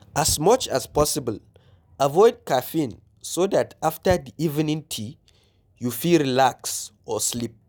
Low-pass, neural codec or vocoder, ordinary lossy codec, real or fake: none; none; none; real